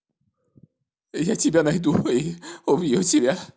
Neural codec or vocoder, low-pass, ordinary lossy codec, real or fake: none; none; none; real